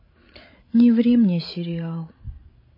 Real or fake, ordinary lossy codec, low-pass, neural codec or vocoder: fake; MP3, 24 kbps; 5.4 kHz; codec, 16 kHz, 16 kbps, FreqCodec, larger model